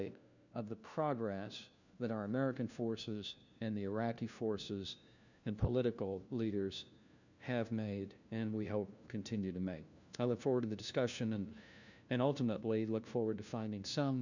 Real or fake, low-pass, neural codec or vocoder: fake; 7.2 kHz; codec, 16 kHz, 1 kbps, FunCodec, trained on LibriTTS, 50 frames a second